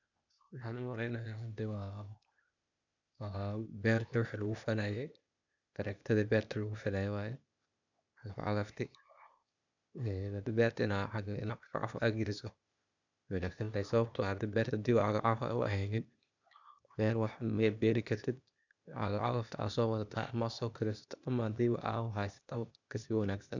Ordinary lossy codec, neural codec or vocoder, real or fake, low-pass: none; codec, 16 kHz, 0.8 kbps, ZipCodec; fake; 7.2 kHz